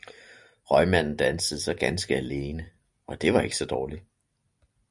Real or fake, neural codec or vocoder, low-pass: real; none; 10.8 kHz